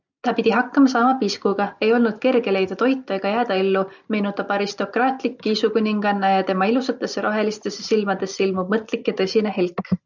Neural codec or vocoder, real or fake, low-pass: none; real; 7.2 kHz